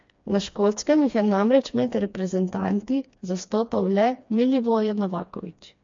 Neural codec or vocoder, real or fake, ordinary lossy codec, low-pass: codec, 16 kHz, 2 kbps, FreqCodec, smaller model; fake; MP3, 48 kbps; 7.2 kHz